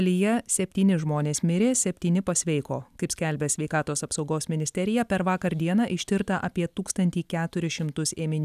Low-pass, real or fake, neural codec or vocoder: 14.4 kHz; real; none